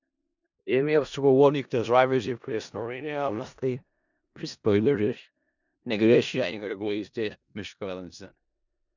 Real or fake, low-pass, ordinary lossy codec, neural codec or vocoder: fake; 7.2 kHz; none; codec, 16 kHz in and 24 kHz out, 0.4 kbps, LongCat-Audio-Codec, four codebook decoder